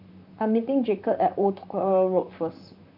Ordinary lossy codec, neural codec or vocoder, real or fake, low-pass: none; vocoder, 44.1 kHz, 128 mel bands, Pupu-Vocoder; fake; 5.4 kHz